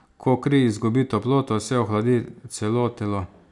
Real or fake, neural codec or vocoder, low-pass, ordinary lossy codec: real; none; 10.8 kHz; none